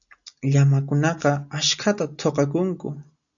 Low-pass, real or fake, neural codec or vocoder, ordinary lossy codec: 7.2 kHz; real; none; MP3, 96 kbps